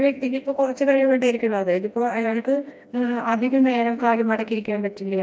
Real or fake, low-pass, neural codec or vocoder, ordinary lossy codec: fake; none; codec, 16 kHz, 1 kbps, FreqCodec, smaller model; none